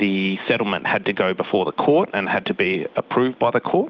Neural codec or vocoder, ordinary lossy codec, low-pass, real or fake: none; Opus, 24 kbps; 7.2 kHz; real